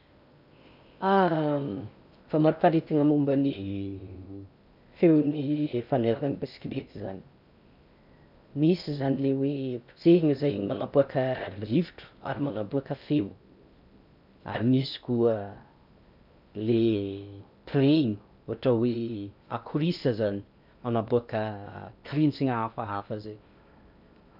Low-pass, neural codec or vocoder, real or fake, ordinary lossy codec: 5.4 kHz; codec, 16 kHz in and 24 kHz out, 0.6 kbps, FocalCodec, streaming, 2048 codes; fake; none